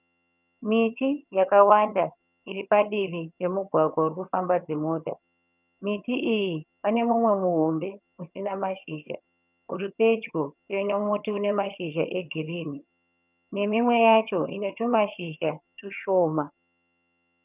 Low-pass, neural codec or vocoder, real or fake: 3.6 kHz; vocoder, 22.05 kHz, 80 mel bands, HiFi-GAN; fake